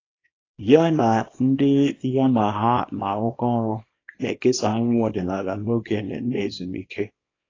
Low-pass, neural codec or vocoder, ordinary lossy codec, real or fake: 7.2 kHz; codec, 24 kHz, 0.9 kbps, WavTokenizer, small release; AAC, 32 kbps; fake